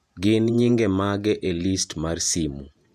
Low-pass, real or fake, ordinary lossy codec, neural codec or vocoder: 14.4 kHz; fake; none; vocoder, 48 kHz, 128 mel bands, Vocos